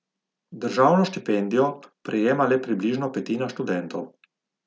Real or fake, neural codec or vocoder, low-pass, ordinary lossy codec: real; none; none; none